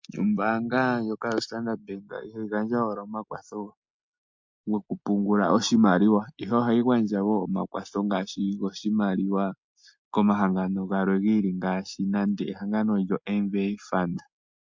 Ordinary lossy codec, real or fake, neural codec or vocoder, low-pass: MP3, 48 kbps; real; none; 7.2 kHz